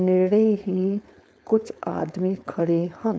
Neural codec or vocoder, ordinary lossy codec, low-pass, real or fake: codec, 16 kHz, 4.8 kbps, FACodec; none; none; fake